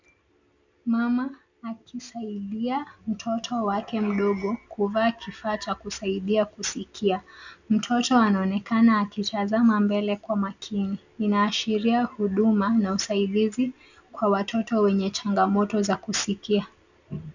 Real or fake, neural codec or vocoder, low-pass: real; none; 7.2 kHz